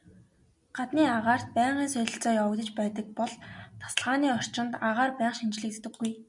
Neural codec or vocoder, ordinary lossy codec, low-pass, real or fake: none; MP3, 96 kbps; 10.8 kHz; real